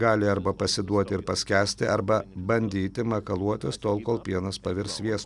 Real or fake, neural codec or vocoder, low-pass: real; none; 10.8 kHz